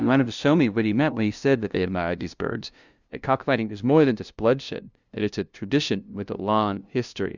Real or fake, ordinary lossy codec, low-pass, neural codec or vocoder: fake; Opus, 64 kbps; 7.2 kHz; codec, 16 kHz, 0.5 kbps, FunCodec, trained on LibriTTS, 25 frames a second